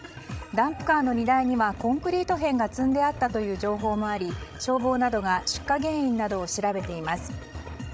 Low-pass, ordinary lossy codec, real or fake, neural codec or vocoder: none; none; fake; codec, 16 kHz, 16 kbps, FreqCodec, larger model